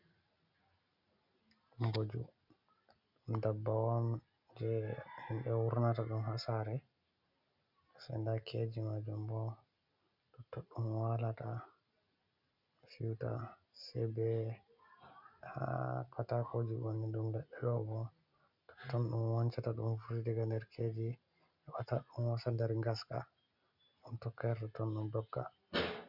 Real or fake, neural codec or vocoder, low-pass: real; none; 5.4 kHz